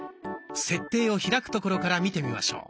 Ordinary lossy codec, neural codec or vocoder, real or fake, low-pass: none; none; real; none